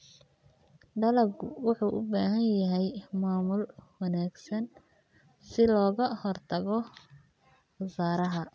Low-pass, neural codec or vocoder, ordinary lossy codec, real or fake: none; none; none; real